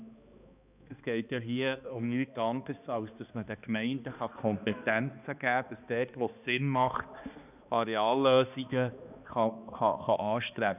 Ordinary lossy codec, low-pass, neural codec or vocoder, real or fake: none; 3.6 kHz; codec, 16 kHz, 2 kbps, X-Codec, HuBERT features, trained on balanced general audio; fake